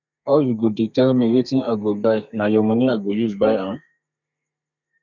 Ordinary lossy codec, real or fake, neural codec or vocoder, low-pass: none; fake; codec, 32 kHz, 1.9 kbps, SNAC; 7.2 kHz